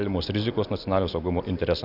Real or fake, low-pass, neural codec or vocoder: real; 5.4 kHz; none